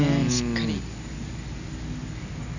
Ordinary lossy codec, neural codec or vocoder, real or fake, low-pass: none; none; real; 7.2 kHz